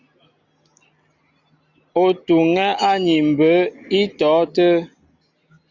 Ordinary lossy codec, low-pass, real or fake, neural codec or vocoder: Opus, 64 kbps; 7.2 kHz; real; none